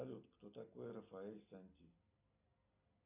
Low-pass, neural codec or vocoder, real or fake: 3.6 kHz; none; real